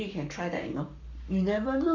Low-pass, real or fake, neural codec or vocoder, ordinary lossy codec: 7.2 kHz; fake; codec, 44.1 kHz, 7.8 kbps, Pupu-Codec; MP3, 64 kbps